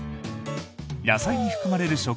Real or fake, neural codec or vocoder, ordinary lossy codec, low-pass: real; none; none; none